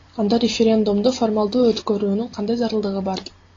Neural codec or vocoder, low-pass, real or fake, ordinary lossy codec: none; 7.2 kHz; real; AAC, 32 kbps